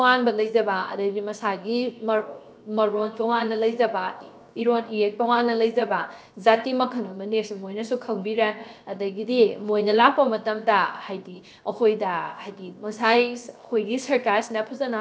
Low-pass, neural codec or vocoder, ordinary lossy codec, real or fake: none; codec, 16 kHz, 0.7 kbps, FocalCodec; none; fake